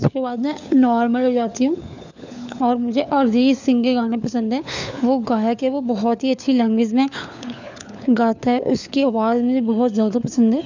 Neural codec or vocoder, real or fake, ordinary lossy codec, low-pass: codec, 16 kHz, 4 kbps, FunCodec, trained on LibriTTS, 50 frames a second; fake; none; 7.2 kHz